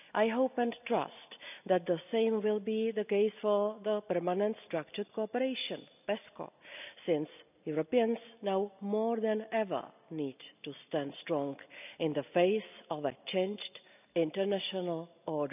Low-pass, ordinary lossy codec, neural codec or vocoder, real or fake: 3.6 kHz; none; none; real